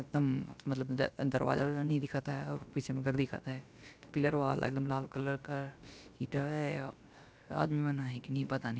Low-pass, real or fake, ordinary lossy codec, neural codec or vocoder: none; fake; none; codec, 16 kHz, about 1 kbps, DyCAST, with the encoder's durations